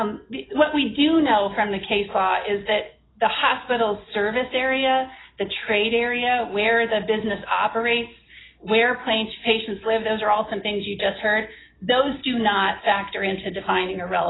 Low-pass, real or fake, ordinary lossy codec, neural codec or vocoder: 7.2 kHz; real; AAC, 16 kbps; none